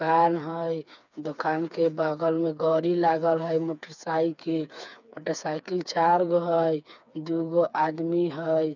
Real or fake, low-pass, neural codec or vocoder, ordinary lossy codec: fake; 7.2 kHz; codec, 16 kHz, 4 kbps, FreqCodec, smaller model; none